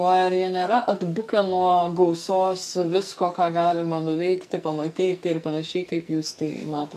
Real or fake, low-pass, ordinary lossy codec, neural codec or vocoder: fake; 14.4 kHz; MP3, 96 kbps; codec, 44.1 kHz, 2.6 kbps, SNAC